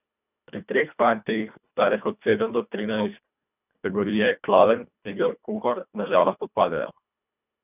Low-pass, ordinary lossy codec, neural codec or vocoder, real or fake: 3.6 kHz; none; codec, 24 kHz, 1.5 kbps, HILCodec; fake